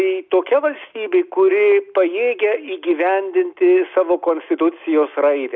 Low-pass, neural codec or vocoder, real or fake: 7.2 kHz; none; real